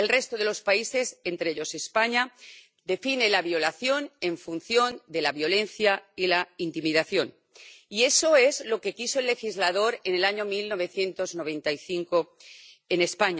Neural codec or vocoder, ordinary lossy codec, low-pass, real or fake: none; none; none; real